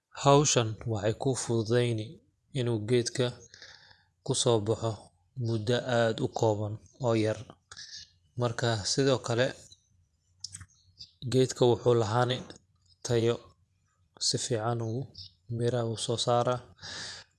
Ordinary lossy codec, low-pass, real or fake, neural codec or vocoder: none; none; fake; vocoder, 24 kHz, 100 mel bands, Vocos